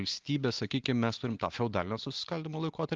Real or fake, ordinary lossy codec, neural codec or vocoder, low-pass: real; Opus, 32 kbps; none; 7.2 kHz